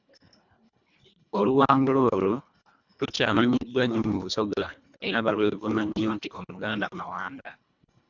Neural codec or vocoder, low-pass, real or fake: codec, 24 kHz, 1.5 kbps, HILCodec; 7.2 kHz; fake